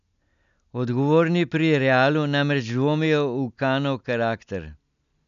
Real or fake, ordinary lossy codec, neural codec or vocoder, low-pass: real; none; none; 7.2 kHz